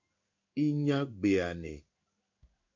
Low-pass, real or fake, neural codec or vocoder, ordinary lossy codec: 7.2 kHz; fake; codec, 16 kHz in and 24 kHz out, 1 kbps, XY-Tokenizer; MP3, 64 kbps